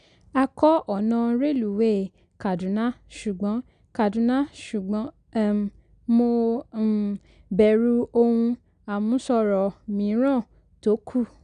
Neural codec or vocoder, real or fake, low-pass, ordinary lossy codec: none; real; 9.9 kHz; none